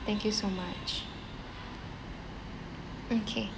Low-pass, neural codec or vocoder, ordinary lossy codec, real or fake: none; none; none; real